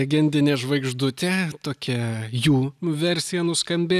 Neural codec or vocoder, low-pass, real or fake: none; 14.4 kHz; real